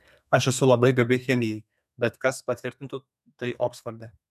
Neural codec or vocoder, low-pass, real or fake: codec, 32 kHz, 1.9 kbps, SNAC; 14.4 kHz; fake